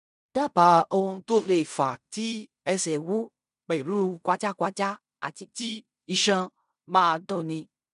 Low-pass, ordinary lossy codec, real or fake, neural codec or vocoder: 10.8 kHz; none; fake; codec, 16 kHz in and 24 kHz out, 0.4 kbps, LongCat-Audio-Codec, fine tuned four codebook decoder